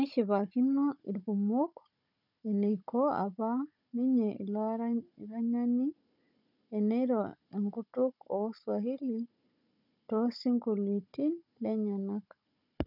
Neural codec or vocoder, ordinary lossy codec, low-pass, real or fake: codec, 16 kHz, 16 kbps, FunCodec, trained on Chinese and English, 50 frames a second; none; 5.4 kHz; fake